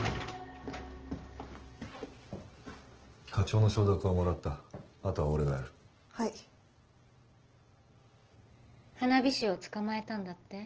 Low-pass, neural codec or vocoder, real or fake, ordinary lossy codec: 7.2 kHz; none; real; Opus, 16 kbps